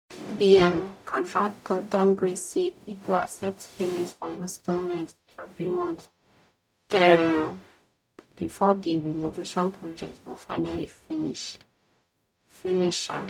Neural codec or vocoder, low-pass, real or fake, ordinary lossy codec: codec, 44.1 kHz, 0.9 kbps, DAC; 19.8 kHz; fake; none